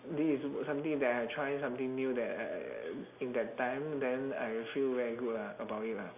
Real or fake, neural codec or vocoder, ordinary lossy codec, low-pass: real; none; none; 3.6 kHz